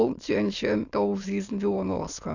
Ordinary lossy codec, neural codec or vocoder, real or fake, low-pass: none; autoencoder, 22.05 kHz, a latent of 192 numbers a frame, VITS, trained on many speakers; fake; 7.2 kHz